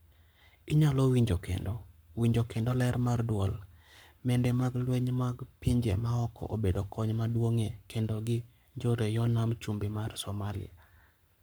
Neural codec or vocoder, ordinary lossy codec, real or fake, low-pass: codec, 44.1 kHz, 7.8 kbps, Pupu-Codec; none; fake; none